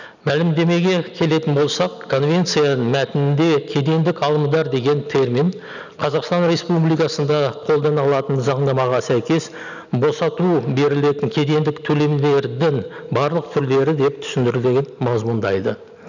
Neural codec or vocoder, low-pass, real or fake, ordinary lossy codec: none; 7.2 kHz; real; none